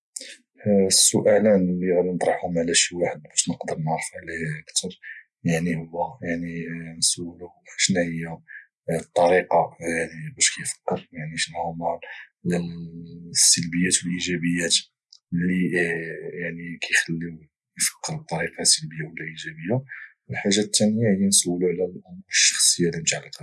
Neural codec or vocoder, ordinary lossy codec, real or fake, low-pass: none; none; real; none